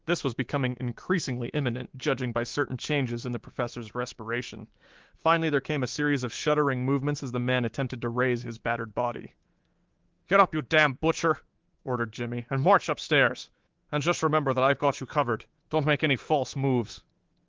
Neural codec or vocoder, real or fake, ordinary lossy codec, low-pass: codec, 24 kHz, 3.1 kbps, DualCodec; fake; Opus, 16 kbps; 7.2 kHz